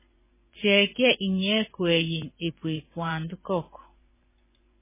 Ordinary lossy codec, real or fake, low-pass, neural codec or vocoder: MP3, 16 kbps; fake; 3.6 kHz; vocoder, 24 kHz, 100 mel bands, Vocos